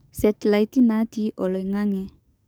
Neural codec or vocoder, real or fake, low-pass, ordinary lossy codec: codec, 44.1 kHz, 7.8 kbps, DAC; fake; none; none